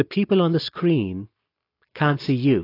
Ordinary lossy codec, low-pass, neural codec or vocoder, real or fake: AAC, 32 kbps; 5.4 kHz; none; real